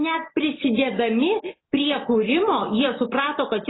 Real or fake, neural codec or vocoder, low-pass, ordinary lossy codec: real; none; 7.2 kHz; AAC, 16 kbps